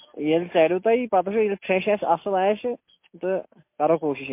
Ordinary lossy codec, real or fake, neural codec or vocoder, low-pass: MP3, 24 kbps; real; none; 3.6 kHz